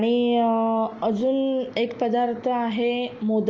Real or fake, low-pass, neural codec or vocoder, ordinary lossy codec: real; 7.2 kHz; none; Opus, 24 kbps